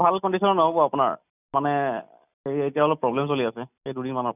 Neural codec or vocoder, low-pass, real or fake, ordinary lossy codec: none; 3.6 kHz; real; none